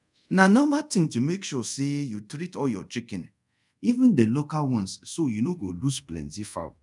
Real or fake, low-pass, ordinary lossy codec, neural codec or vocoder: fake; 10.8 kHz; none; codec, 24 kHz, 0.5 kbps, DualCodec